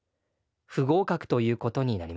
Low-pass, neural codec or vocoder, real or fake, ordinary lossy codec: none; none; real; none